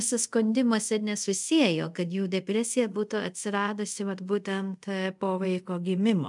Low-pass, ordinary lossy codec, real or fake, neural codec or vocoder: 10.8 kHz; MP3, 96 kbps; fake; codec, 24 kHz, 0.5 kbps, DualCodec